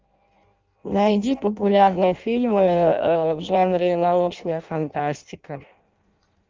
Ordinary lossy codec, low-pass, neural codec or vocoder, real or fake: Opus, 32 kbps; 7.2 kHz; codec, 16 kHz in and 24 kHz out, 0.6 kbps, FireRedTTS-2 codec; fake